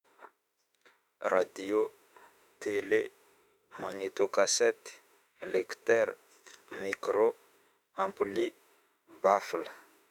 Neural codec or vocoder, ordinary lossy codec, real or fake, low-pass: autoencoder, 48 kHz, 32 numbers a frame, DAC-VAE, trained on Japanese speech; none; fake; 19.8 kHz